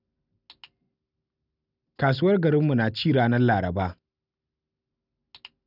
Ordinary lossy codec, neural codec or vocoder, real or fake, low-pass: none; none; real; 5.4 kHz